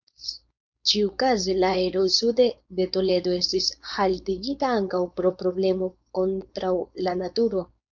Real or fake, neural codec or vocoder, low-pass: fake; codec, 16 kHz, 4.8 kbps, FACodec; 7.2 kHz